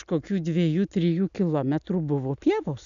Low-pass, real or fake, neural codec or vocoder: 7.2 kHz; real; none